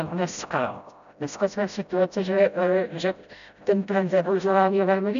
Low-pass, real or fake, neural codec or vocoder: 7.2 kHz; fake; codec, 16 kHz, 0.5 kbps, FreqCodec, smaller model